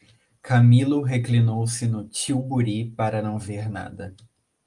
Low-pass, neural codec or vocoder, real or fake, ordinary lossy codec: 10.8 kHz; none; real; Opus, 32 kbps